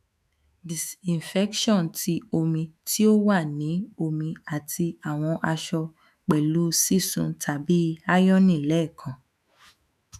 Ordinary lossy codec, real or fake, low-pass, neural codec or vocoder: none; fake; 14.4 kHz; autoencoder, 48 kHz, 128 numbers a frame, DAC-VAE, trained on Japanese speech